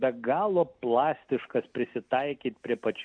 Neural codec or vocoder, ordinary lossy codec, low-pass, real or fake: none; MP3, 96 kbps; 9.9 kHz; real